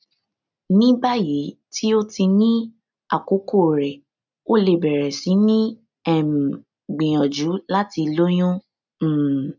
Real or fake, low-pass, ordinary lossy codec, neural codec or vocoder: real; 7.2 kHz; none; none